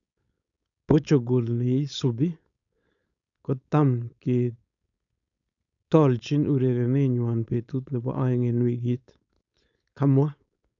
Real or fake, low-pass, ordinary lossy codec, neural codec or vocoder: fake; 7.2 kHz; none; codec, 16 kHz, 4.8 kbps, FACodec